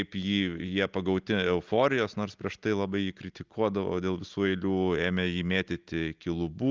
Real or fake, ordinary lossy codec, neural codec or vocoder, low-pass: real; Opus, 32 kbps; none; 7.2 kHz